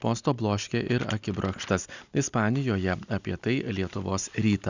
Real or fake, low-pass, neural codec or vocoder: real; 7.2 kHz; none